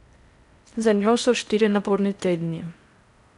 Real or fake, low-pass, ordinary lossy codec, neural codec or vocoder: fake; 10.8 kHz; none; codec, 16 kHz in and 24 kHz out, 0.6 kbps, FocalCodec, streaming, 4096 codes